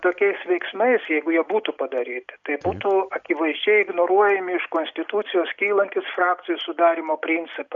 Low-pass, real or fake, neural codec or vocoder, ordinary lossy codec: 7.2 kHz; real; none; Opus, 64 kbps